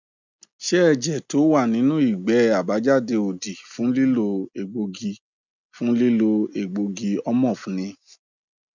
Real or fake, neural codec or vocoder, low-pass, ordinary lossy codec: real; none; 7.2 kHz; none